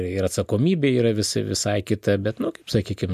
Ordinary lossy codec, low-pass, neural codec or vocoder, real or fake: MP3, 64 kbps; 14.4 kHz; none; real